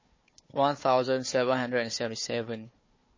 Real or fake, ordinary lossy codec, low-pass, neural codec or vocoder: fake; MP3, 32 kbps; 7.2 kHz; codec, 16 kHz, 4 kbps, FunCodec, trained on Chinese and English, 50 frames a second